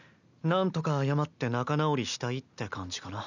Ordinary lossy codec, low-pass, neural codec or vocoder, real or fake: none; 7.2 kHz; none; real